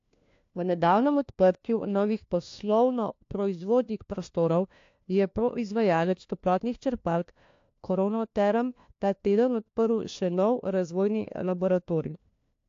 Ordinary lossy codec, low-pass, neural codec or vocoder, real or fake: AAC, 48 kbps; 7.2 kHz; codec, 16 kHz, 1 kbps, FunCodec, trained on LibriTTS, 50 frames a second; fake